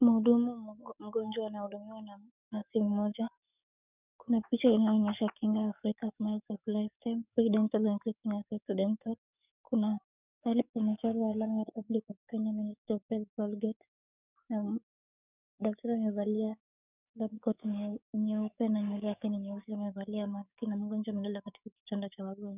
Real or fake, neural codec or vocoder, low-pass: fake; codec, 44.1 kHz, 7.8 kbps, DAC; 3.6 kHz